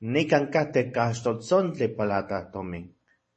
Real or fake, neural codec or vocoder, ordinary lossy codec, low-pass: fake; vocoder, 44.1 kHz, 128 mel bands every 512 samples, BigVGAN v2; MP3, 32 kbps; 10.8 kHz